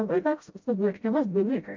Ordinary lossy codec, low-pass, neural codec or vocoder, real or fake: MP3, 48 kbps; 7.2 kHz; codec, 16 kHz, 0.5 kbps, FreqCodec, smaller model; fake